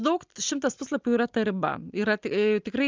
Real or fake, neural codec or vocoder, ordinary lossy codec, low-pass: real; none; Opus, 32 kbps; 7.2 kHz